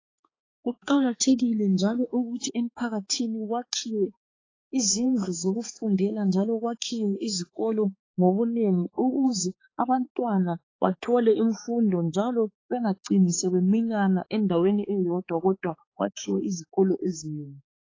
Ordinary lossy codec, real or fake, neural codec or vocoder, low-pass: AAC, 32 kbps; fake; codec, 16 kHz, 4 kbps, X-Codec, HuBERT features, trained on balanced general audio; 7.2 kHz